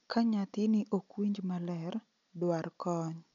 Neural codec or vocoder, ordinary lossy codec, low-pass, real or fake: none; none; 7.2 kHz; real